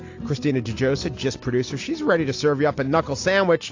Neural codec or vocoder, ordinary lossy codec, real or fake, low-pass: none; AAC, 48 kbps; real; 7.2 kHz